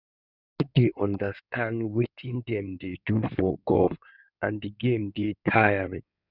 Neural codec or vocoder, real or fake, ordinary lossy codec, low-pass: codec, 16 kHz in and 24 kHz out, 2.2 kbps, FireRedTTS-2 codec; fake; none; 5.4 kHz